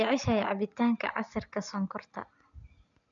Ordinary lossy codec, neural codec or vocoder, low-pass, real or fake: none; none; 7.2 kHz; real